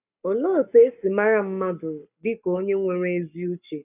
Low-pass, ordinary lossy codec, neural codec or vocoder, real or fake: 3.6 kHz; none; codec, 44.1 kHz, 7.8 kbps, Pupu-Codec; fake